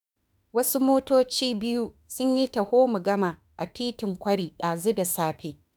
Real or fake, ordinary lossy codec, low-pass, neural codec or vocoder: fake; none; none; autoencoder, 48 kHz, 32 numbers a frame, DAC-VAE, trained on Japanese speech